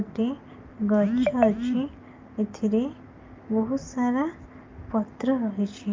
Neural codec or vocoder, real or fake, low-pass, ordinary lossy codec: none; real; 7.2 kHz; Opus, 24 kbps